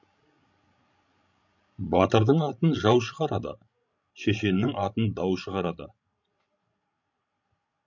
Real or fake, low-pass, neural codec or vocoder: fake; 7.2 kHz; codec, 16 kHz, 16 kbps, FreqCodec, larger model